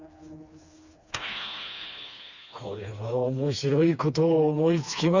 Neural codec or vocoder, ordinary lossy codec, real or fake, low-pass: codec, 16 kHz, 2 kbps, FreqCodec, smaller model; Opus, 64 kbps; fake; 7.2 kHz